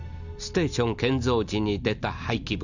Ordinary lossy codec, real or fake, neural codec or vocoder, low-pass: none; real; none; 7.2 kHz